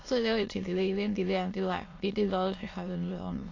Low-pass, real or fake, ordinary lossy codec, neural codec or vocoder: 7.2 kHz; fake; AAC, 32 kbps; autoencoder, 22.05 kHz, a latent of 192 numbers a frame, VITS, trained on many speakers